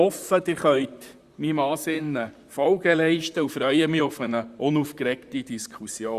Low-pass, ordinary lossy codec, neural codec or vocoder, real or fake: 14.4 kHz; Opus, 64 kbps; vocoder, 44.1 kHz, 128 mel bands, Pupu-Vocoder; fake